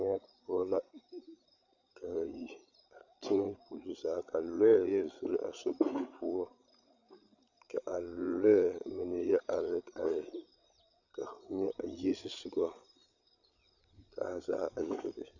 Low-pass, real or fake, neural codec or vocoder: 7.2 kHz; fake; codec, 16 kHz, 8 kbps, FreqCodec, larger model